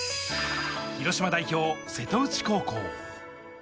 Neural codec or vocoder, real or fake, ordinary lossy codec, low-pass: none; real; none; none